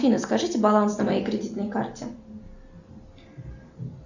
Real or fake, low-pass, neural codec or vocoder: real; 7.2 kHz; none